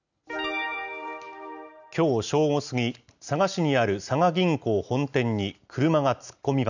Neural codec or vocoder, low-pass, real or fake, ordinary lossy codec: none; 7.2 kHz; real; none